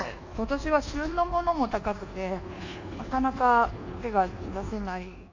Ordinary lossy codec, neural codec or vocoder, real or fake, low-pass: AAC, 48 kbps; codec, 24 kHz, 1.2 kbps, DualCodec; fake; 7.2 kHz